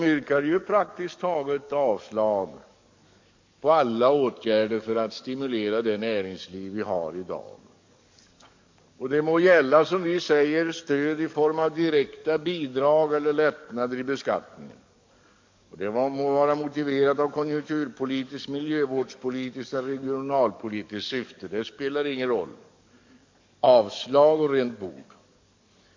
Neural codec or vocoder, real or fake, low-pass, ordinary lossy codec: codec, 44.1 kHz, 7.8 kbps, DAC; fake; 7.2 kHz; MP3, 48 kbps